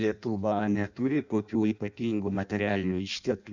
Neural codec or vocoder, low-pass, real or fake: codec, 16 kHz in and 24 kHz out, 0.6 kbps, FireRedTTS-2 codec; 7.2 kHz; fake